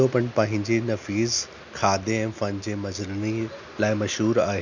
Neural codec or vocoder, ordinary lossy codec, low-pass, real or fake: none; none; 7.2 kHz; real